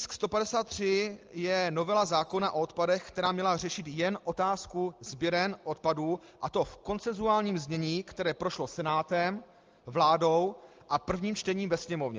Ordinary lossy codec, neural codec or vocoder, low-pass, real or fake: Opus, 24 kbps; none; 7.2 kHz; real